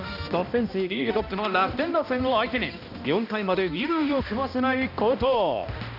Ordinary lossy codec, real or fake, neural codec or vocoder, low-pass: none; fake; codec, 16 kHz, 1 kbps, X-Codec, HuBERT features, trained on balanced general audio; 5.4 kHz